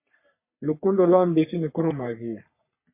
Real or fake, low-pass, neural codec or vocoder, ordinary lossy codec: fake; 3.6 kHz; codec, 44.1 kHz, 3.4 kbps, Pupu-Codec; MP3, 24 kbps